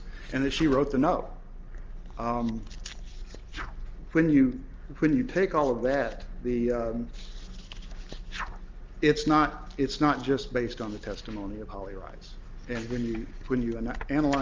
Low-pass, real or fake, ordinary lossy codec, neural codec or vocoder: 7.2 kHz; real; Opus, 24 kbps; none